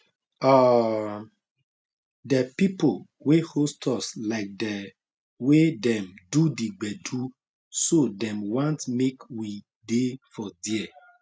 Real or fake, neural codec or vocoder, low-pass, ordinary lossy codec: real; none; none; none